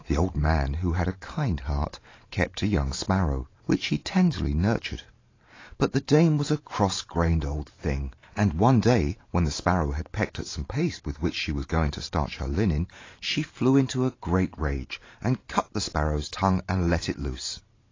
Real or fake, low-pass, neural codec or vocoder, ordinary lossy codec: fake; 7.2 kHz; vocoder, 44.1 kHz, 128 mel bands every 512 samples, BigVGAN v2; AAC, 32 kbps